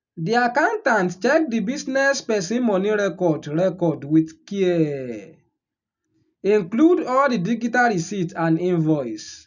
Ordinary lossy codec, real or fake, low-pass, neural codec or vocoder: none; real; 7.2 kHz; none